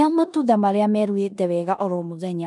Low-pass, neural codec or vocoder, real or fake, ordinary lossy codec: 10.8 kHz; codec, 16 kHz in and 24 kHz out, 0.9 kbps, LongCat-Audio-Codec, four codebook decoder; fake; none